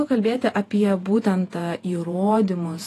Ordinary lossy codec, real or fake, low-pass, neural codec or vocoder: AAC, 48 kbps; fake; 14.4 kHz; vocoder, 48 kHz, 128 mel bands, Vocos